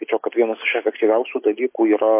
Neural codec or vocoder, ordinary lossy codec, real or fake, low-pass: none; MP3, 24 kbps; real; 3.6 kHz